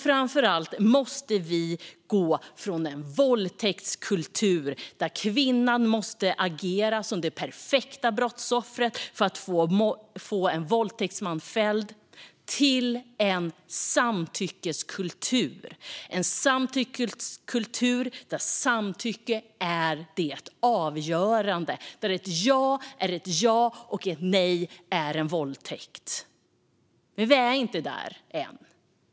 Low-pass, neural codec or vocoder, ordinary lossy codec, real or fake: none; none; none; real